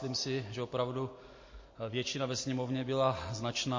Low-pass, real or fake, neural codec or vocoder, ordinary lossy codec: 7.2 kHz; real; none; MP3, 32 kbps